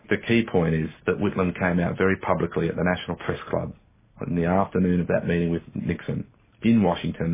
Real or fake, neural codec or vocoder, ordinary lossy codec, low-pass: real; none; MP3, 16 kbps; 3.6 kHz